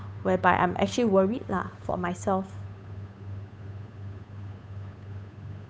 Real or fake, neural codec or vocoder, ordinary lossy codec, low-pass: fake; codec, 16 kHz, 8 kbps, FunCodec, trained on Chinese and English, 25 frames a second; none; none